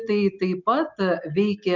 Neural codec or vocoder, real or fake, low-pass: none; real; 7.2 kHz